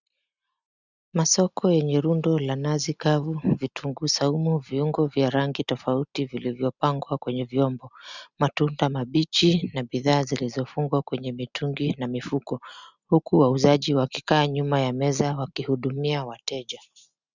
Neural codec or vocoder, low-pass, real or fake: none; 7.2 kHz; real